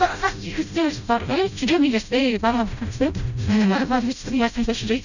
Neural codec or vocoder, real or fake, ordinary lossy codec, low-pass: codec, 16 kHz, 0.5 kbps, FreqCodec, smaller model; fake; none; 7.2 kHz